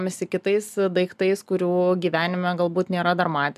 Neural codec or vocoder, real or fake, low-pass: none; real; 14.4 kHz